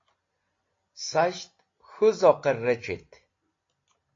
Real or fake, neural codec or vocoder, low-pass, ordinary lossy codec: real; none; 7.2 kHz; AAC, 32 kbps